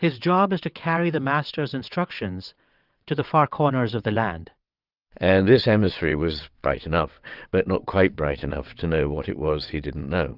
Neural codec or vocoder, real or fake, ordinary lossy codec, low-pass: vocoder, 22.05 kHz, 80 mel bands, WaveNeXt; fake; Opus, 24 kbps; 5.4 kHz